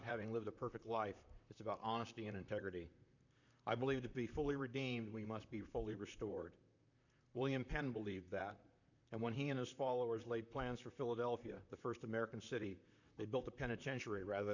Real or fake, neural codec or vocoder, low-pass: fake; vocoder, 44.1 kHz, 128 mel bands, Pupu-Vocoder; 7.2 kHz